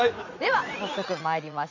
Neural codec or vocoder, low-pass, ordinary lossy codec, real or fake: vocoder, 44.1 kHz, 80 mel bands, Vocos; 7.2 kHz; none; fake